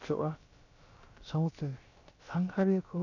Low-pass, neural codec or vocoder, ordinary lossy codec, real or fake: 7.2 kHz; codec, 16 kHz, about 1 kbps, DyCAST, with the encoder's durations; none; fake